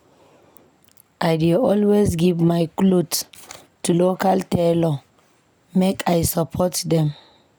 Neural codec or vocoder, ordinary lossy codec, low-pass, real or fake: none; none; none; real